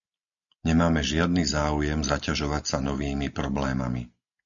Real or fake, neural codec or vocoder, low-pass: real; none; 7.2 kHz